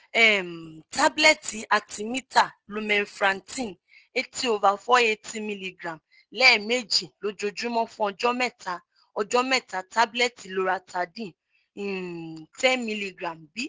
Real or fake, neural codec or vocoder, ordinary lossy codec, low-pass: real; none; Opus, 16 kbps; 7.2 kHz